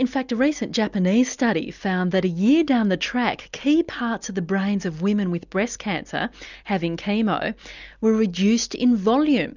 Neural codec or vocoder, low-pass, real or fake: none; 7.2 kHz; real